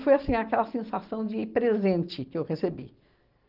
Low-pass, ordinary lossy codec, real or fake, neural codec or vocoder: 5.4 kHz; Opus, 32 kbps; real; none